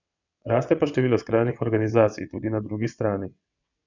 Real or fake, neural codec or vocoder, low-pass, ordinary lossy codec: fake; vocoder, 22.05 kHz, 80 mel bands, WaveNeXt; 7.2 kHz; none